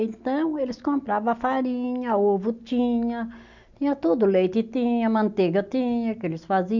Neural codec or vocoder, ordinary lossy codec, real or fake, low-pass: none; none; real; 7.2 kHz